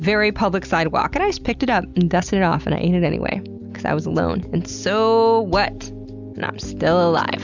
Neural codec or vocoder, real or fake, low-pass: none; real; 7.2 kHz